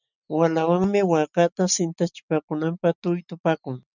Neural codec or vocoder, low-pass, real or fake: none; 7.2 kHz; real